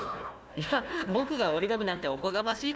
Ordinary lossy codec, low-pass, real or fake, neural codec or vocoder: none; none; fake; codec, 16 kHz, 1 kbps, FunCodec, trained on Chinese and English, 50 frames a second